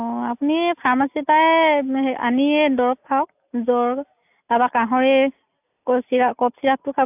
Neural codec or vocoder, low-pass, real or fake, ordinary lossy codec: none; 3.6 kHz; real; AAC, 32 kbps